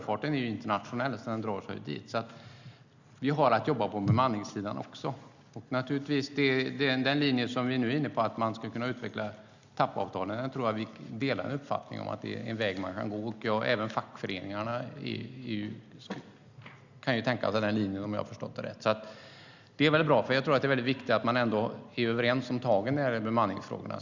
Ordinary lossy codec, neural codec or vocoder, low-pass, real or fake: Opus, 64 kbps; none; 7.2 kHz; real